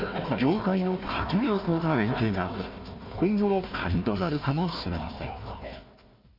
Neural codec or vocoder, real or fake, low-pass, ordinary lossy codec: codec, 16 kHz, 1 kbps, FunCodec, trained on Chinese and English, 50 frames a second; fake; 5.4 kHz; MP3, 32 kbps